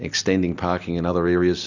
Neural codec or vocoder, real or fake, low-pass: none; real; 7.2 kHz